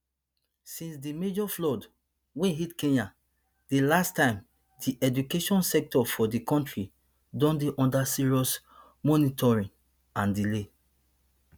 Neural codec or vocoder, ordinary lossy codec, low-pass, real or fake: none; none; none; real